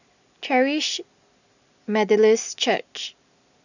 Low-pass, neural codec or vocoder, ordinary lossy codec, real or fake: 7.2 kHz; none; none; real